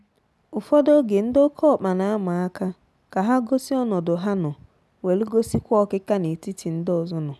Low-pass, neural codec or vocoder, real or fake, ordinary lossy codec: none; none; real; none